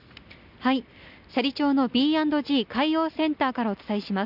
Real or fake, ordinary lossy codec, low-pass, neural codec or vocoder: real; none; 5.4 kHz; none